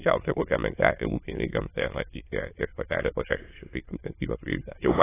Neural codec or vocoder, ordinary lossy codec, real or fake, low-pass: autoencoder, 22.05 kHz, a latent of 192 numbers a frame, VITS, trained on many speakers; AAC, 16 kbps; fake; 3.6 kHz